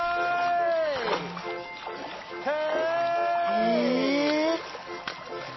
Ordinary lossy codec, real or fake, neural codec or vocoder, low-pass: MP3, 24 kbps; real; none; 7.2 kHz